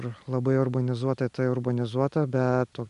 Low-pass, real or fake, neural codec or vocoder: 10.8 kHz; real; none